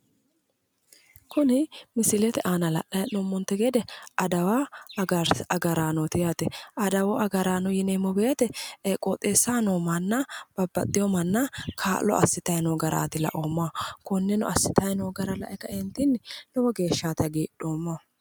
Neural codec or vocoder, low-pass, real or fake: none; 19.8 kHz; real